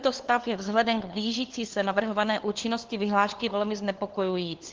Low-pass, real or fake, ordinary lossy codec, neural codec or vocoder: 7.2 kHz; fake; Opus, 16 kbps; codec, 16 kHz, 2 kbps, FunCodec, trained on LibriTTS, 25 frames a second